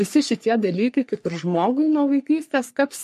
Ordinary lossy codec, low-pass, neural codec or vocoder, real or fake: MP3, 64 kbps; 14.4 kHz; codec, 44.1 kHz, 3.4 kbps, Pupu-Codec; fake